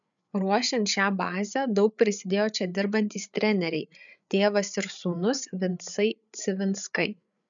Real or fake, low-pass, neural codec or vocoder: fake; 7.2 kHz; codec, 16 kHz, 8 kbps, FreqCodec, larger model